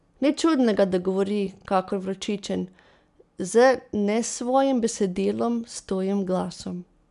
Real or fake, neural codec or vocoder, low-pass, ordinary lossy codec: real; none; 10.8 kHz; none